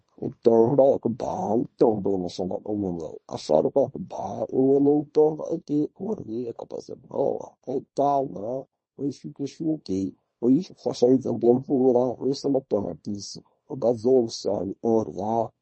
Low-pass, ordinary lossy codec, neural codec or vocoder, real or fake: 10.8 kHz; MP3, 32 kbps; codec, 24 kHz, 0.9 kbps, WavTokenizer, small release; fake